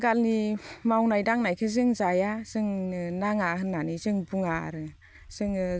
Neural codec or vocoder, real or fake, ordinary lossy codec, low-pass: none; real; none; none